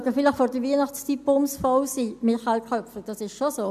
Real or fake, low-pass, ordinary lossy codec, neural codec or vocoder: real; 14.4 kHz; AAC, 96 kbps; none